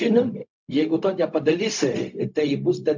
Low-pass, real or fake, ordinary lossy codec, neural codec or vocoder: 7.2 kHz; fake; MP3, 48 kbps; codec, 16 kHz, 0.4 kbps, LongCat-Audio-Codec